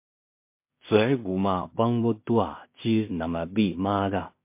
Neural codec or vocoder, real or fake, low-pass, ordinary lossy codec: codec, 16 kHz in and 24 kHz out, 0.4 kbps, LongCat-Audio-Codec, two codebook decoder; fake; 3.6 kHz; MP3, 24 kbps